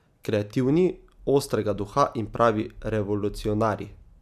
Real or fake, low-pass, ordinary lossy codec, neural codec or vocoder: real; 14.4 kHz; none; none